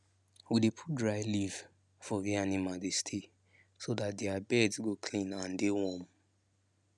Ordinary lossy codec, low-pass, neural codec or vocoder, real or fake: none; none; none; real